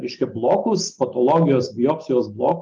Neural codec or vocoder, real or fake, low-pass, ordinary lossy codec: none; real; 7.2 kHz; Opus, 32 kbps